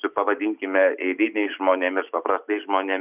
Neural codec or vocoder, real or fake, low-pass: none; real; 3.6 kHz